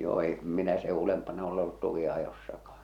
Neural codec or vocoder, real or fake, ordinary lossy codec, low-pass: none; real; none; 19.8 kHz